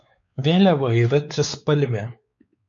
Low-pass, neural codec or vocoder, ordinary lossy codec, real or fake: 7.2 kHz; codec, 16 kHz, 4 kbps, X-Codec, WavLM features, trained on Multilingual LibriSpeech; MP3, 48 kbps; fake